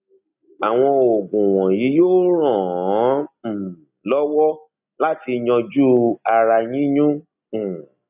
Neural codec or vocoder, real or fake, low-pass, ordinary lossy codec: none; real; 3.6 kHz; none